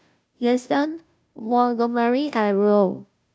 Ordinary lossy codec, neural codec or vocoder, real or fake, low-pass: none; codec, 16 kHz, 0.5 kbps, FunCodec, trained on Chinese and English, 25 frames a second; fake; none